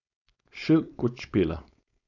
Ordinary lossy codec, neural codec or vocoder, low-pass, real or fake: none; codec, 16 kHz, 4.8 kbps, FACodec; 7.2 kHz; fake